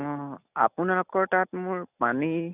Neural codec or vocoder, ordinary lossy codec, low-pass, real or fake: none; none; 3.6 kHz; real